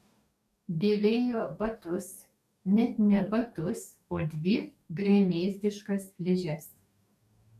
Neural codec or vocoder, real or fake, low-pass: codec, 44.1 kHz, 2.6 kbps, DAC; fake; 14.4 kHz